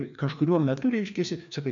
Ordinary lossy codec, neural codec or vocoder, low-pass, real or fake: MP3, 64 kbps; codec, 16 kHz, 2 kbps, FreqCodec, larger model; 7.2 kHz; fake